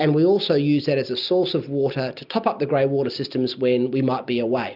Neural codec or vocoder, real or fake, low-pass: none; real; 5.4 kHz